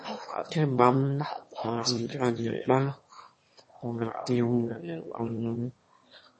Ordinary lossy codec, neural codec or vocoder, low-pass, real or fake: MP3, 32 kbps; autoencoder, 22.05 kHz, a latent of 192 numbers a frame, VITS, trained on one speaker; 9.9 kHz; fake